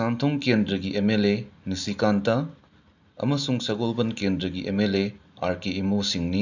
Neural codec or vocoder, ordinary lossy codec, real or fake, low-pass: none; none; real; 7.2 kHz